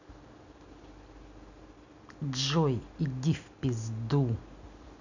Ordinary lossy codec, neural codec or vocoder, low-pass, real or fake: none; none; 7.2 kHz; real